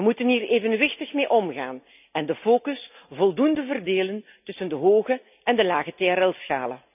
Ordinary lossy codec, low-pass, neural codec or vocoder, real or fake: none; 3.6 kHz; none; real